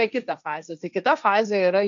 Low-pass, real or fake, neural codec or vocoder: 7.2 kHz; fake; codec, 16 kHz, 1.1 kbps, Voila-Tokenizer